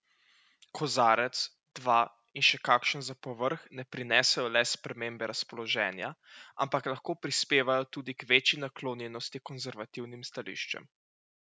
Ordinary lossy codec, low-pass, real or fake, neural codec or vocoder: none; none; real; none